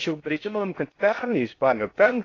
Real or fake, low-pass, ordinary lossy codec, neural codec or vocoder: fake; 7.2 kHz; AAC, 32 kbps; codec, 16 kHz in and 24 kHz out, 0.6 kbps, FocalCodec, streaming, 2048 codes